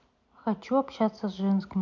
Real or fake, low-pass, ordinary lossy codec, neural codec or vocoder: real; 7.2 kHz; none; none